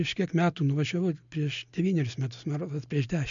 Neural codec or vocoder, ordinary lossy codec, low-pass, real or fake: none; MP3, 64 kbps; 7.2 kHz; real